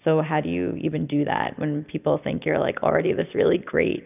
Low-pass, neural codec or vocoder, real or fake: 3.6 kHz; none; real